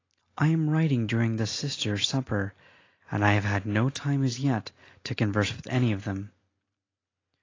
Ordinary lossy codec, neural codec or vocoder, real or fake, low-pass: AAC, 32 kbps; none; real; 7.2 kHz